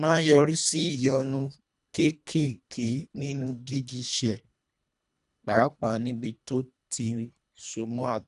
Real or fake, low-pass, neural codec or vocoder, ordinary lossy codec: fake; 10.8 kHz; codec, 24 kHz, 1.5 kbps, HILCodec; none